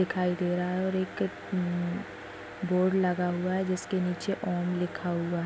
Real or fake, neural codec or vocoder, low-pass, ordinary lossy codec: real; none; none; none